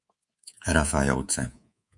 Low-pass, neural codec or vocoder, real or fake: 10.8 kHz; codec, 24 kHz, 3.1 kbps, DualCodec; fake